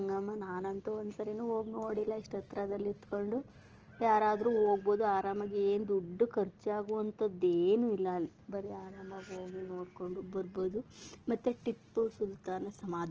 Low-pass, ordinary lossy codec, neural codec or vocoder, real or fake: 7.2 kHz; Opus, 16 kbps; none; real